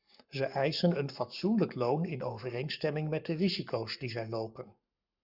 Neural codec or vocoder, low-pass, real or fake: codec, 44.1 kHz, 7.8 kbps, Pupu-Codec; 5.4 kHz; fake